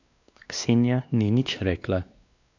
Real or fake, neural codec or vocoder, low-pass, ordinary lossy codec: fake; codec, 16 kHz, 2 kbps, X-Codec, WavLM features, trained on Multilingual LibriSpeech; 7.2 kHz; none